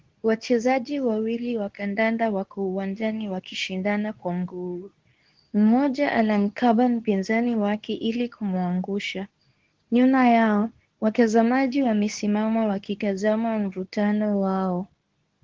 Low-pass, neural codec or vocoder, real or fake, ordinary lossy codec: 7.2 kHz; codec, 24 kHz, 0.9 kbps, WavTokenizer, medium speech release version 2; fake; Opus, 16 kbps